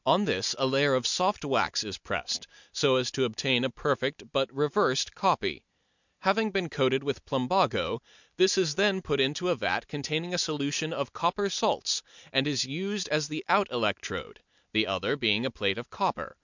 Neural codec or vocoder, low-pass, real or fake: none; 7.2 kHz; real